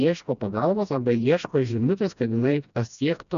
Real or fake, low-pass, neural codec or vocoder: fake; 7.2 kHz; codec, 16 kHz, 1 kbps, FreqCodec, smaller model